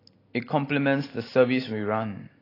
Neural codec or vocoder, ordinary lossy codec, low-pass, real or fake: none; AAC, 24 kbps; 5.4 kHz; real